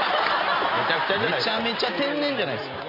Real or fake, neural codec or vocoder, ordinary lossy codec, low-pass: real; none; MP3, 24 kbps; 5.4 kHz